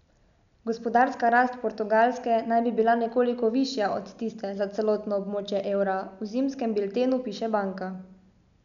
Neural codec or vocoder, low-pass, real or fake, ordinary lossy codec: none; 7.2 kHz; real; none